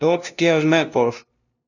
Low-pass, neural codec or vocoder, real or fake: 7.2 kHz; codec, 16 kHz, 0.5 kbps, FunCodec, trained on LibriTTS, 25 frames a second; fake